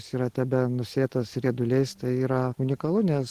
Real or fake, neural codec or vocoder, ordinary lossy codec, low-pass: real; none; Opus, 16 kbps; 14.4 kHz